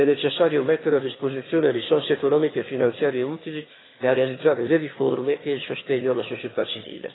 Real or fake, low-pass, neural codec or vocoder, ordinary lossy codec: fake; 7.2 kHz; autoencoder, 22.05 kHz, a latent of 192 numbers a frame, VITS, trained on one speaker; AAC, 16 kbps